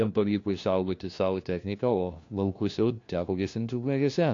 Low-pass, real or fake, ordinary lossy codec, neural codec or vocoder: 7.2 kHz; fake; AAC, 48 kbps; codec, 16 kHz, 1 kbps, FunCodec, trained on LibriTTS, 50 frames a second